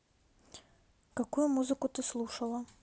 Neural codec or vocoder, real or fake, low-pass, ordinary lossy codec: none; real; none; none